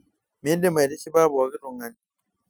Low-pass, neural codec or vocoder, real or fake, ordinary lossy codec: none; none; real; none